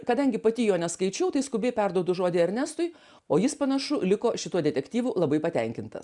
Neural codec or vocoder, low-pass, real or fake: none; 10.8 kHz; real